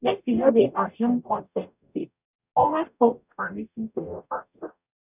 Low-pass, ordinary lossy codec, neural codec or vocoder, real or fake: 3.6 kHz; none; codec, 44.1 kHz, 0.9 kbps, DAC; fake